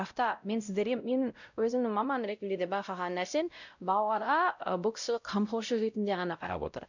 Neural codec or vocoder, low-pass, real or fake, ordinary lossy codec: codec, 16 kHz, 0.5 kbps, X-Codec, WavLM features, trained on Multilingual LibriSpeech; 7.2 kHz; fake; none